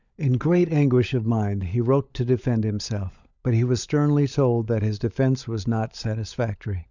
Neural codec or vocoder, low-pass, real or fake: codec, 16 kHz, 16 kbps, FunCodec, trained on LibriTTS, 50 frames a second; 7.2 kHz; fake